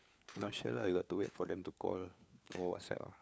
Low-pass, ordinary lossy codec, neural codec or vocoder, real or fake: none; none; codec, 16 kHz, 4 kbps, FunCodec, trained on LibriTTS, 50 frames a second; fake